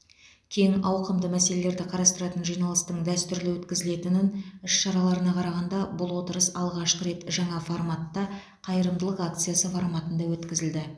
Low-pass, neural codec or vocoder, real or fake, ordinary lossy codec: none; none; real; none